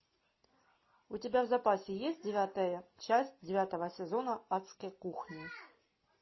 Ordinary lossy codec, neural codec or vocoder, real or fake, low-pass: MP3, 24 kbps; none; real; 7.2 kHz